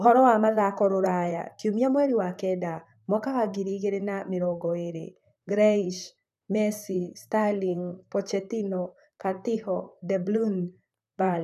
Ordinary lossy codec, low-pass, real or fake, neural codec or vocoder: none; 14.4 kHz; fake; vocoder, 44.1 kHz, 128 mel bands, Pupu-Vocoder